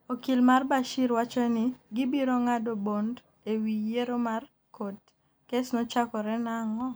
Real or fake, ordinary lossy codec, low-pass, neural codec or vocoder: real; none; none; none